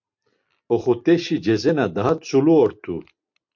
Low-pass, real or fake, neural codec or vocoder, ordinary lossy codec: 7.2 kHz; real; none; MP3, 48 kbps